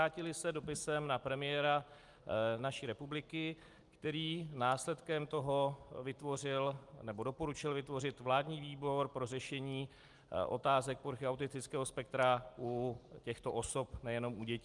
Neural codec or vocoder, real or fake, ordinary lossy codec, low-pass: none; real; Opus, 32 kbps; 10.8 kHz